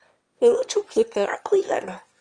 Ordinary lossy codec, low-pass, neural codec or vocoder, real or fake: Opus, 32 kbps; 9.9 kHz; autoencoder, 22.05 kHz, a latent of 192 numbers a frame, VITS, trained on one speaker; fake